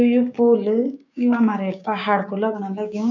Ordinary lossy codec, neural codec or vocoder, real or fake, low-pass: none; codec, 16 kHz, 6 kbps, DAC; fake; 7.2 kHz